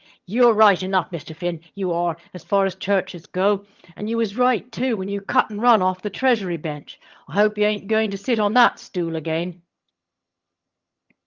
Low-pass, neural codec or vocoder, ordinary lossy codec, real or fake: 7.2 kHz; vocoder, 22.05 kHz, 80 mel bands, HiFi-GAN; Opus, 24 kbps; fake